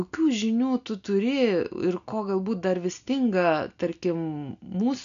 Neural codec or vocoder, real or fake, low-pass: none; real; 7.2 kHz